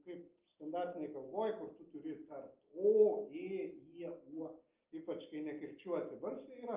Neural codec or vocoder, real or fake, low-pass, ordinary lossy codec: none; real; 3.6 kHz; Opus, 24 kbps